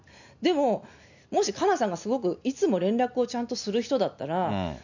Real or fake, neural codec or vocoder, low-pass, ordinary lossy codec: real; none; 7.2 kHz; none